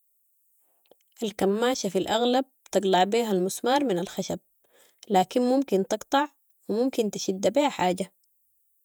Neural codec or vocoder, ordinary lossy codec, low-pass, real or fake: vocoder, 48 kHz, 128 mel bands, Vocos; none; none; fake